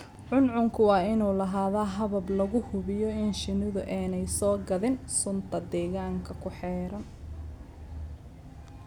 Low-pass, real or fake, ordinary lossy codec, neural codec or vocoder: 19.8 kHz; real; none; none